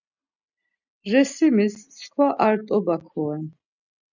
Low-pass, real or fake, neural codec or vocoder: 7.2 kHz; real; none